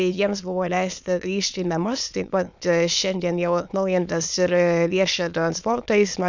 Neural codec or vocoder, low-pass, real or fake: autoencoder, 22.05 kHz, a latent of 192 numbers a frame, VITS, trained on many speakers; 7.2 kHz; fake